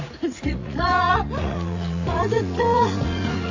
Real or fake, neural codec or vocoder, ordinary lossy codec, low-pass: fake; vocoder, 44.1 kHz, 80 mel bands, Vocos; AAC, 48 kbps; 7.2 kHz